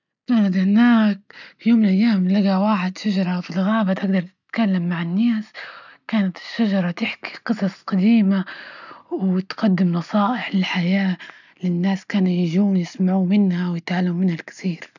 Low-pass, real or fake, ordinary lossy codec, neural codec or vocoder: 7.2 kHz; real; none; none